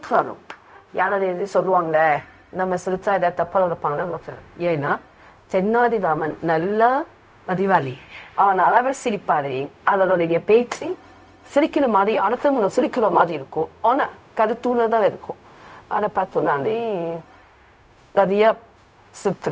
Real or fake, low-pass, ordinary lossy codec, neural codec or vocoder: fake; none; none; codec, 16 kHz, 0.4 kbps, LongCat-Audio-Codec